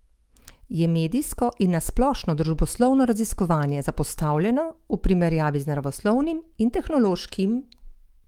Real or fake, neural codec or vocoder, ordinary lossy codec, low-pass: fake; autoencoder, 48 kHz, 128 numbers a frame, DAC-VAE, trained on Japanese speech; Opus, 24 kbps; 19.8 kHz